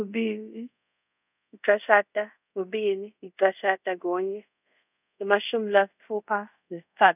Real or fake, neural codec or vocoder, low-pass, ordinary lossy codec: fake; codec, 24 kHz, 0.5 kbps, DualCodec; 3.6 kHz; none